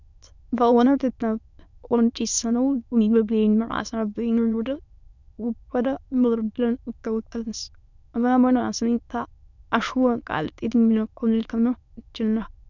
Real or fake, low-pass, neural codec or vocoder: fake; 7.2 kHz; autoencoder, 22.05 kHz, a latent of 192 numbers a frame, VITS, trained on many speakers